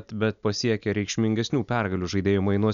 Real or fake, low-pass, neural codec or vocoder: real; 7.2 kHz; none